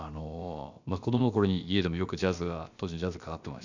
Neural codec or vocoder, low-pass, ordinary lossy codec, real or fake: codec, 16 kHz, about 1 kbps, DyCAST, with the encoder's durations; 7.2 kHz; none; fake